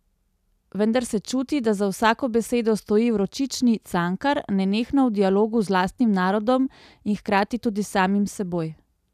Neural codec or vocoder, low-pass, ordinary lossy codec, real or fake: none; 14.4 kHz; none; real